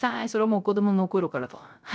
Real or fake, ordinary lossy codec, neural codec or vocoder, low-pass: fake; none; codec, 16 kHz, 0.3 kbps, FocalCodec; none